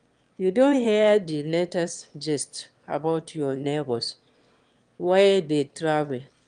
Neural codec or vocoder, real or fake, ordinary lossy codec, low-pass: autoencoder, 22.05 kHz, a latent of 192 numbers a frame, VITS, trained on one speaker; fake; Opus, 32 kbps; 9.9 kHz